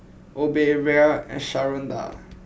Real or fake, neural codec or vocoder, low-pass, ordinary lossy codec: real; none; none; none